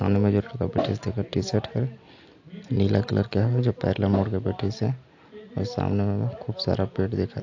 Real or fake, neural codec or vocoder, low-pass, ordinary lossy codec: real; none; 7.2 kHz; AAC, 48 kbps